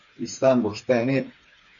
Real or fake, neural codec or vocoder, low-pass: fake; codec, 16 kHz, 4 kbps, FreqCodec, smaller model; 7.2 kHz